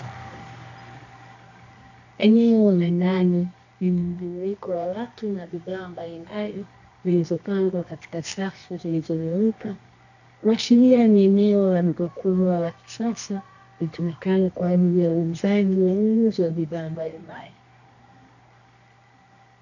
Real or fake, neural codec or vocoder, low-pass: fake; codec, 24 kHz, 0.9 kbps, WavTokenizer, medium music audio release; 7.2 kHz